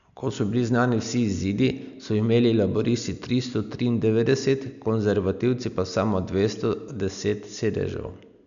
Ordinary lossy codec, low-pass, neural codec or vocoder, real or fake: none; 7.2 kHz; none; real